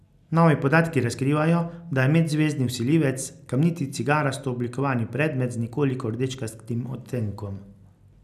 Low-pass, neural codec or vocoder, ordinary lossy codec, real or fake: 14.4 kHz; none; none; real